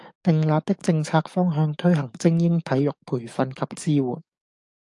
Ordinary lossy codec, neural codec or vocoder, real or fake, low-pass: AAC, 64 kbps; codec, 44.1 kHz, 7.8 kbps, DAC; fake; 10.8 kHz